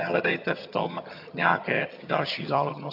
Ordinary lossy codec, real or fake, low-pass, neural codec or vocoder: AAC, 48 kbps; fake; 5.4 kHz; vocoder, 22.05 kHz, 80 mel bands, HiFi-GAN